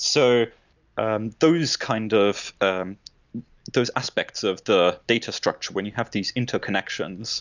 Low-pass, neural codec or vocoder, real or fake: 7.2 kHz; none; real